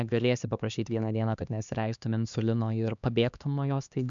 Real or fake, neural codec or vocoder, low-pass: fake; codec, 16 kHz, 2 kbps, X-Codec, HuBERT features, trained on LibriSpeech; 7.2 kHz